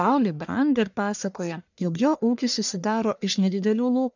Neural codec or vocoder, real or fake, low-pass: codec, 44.1 kHz, 1.7 kbps, Pupu-Codec; fake; 7.2 kHz